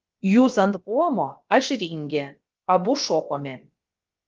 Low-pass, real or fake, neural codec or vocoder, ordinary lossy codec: 7.2 kHz; fake; codec, 16 kHz, about 1 kbps, DyCAST, with the encoder's durations; Opus, 24 kbps